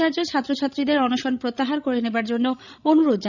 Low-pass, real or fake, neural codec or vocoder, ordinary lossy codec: 7.2 kHz; fake; codec, 16 kHz, 16 kbps, FreqCodec, larger model; none